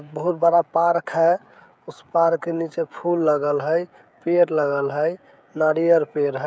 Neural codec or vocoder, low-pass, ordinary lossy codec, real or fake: codec, 16 kHz, 16 kbps, FreqCodec, smaller model; none; none; fake